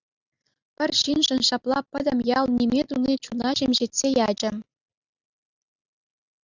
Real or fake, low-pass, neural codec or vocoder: real; 7.2 kHz; none